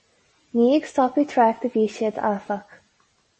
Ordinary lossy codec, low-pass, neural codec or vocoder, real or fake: MP3, 32 kbps; 10.8 kHz; none; real